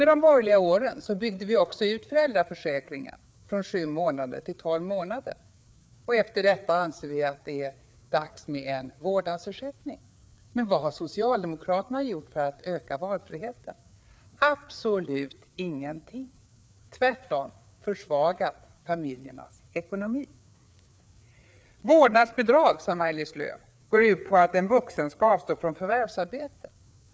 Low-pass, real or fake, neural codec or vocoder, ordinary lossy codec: none; fake; codec, 16 kHz, 4 kbps, FreqCodec, larger model; none